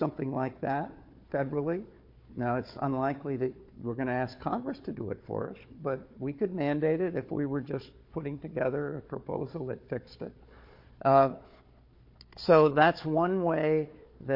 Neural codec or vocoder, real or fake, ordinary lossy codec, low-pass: codec, 16 kHz, 4 kbps, FunCodec, trained on Chinese and English, 50 frames a second; fake; MP3, 32 kbps; 5.4 kHz